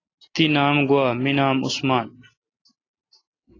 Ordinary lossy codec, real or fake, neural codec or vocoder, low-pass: AAC, 32 kbps; real; none; 7.2 kHz